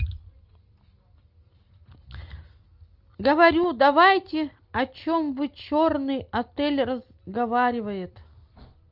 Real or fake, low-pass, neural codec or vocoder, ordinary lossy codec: real; 5.4 kHz; none; Opus, 24 kbps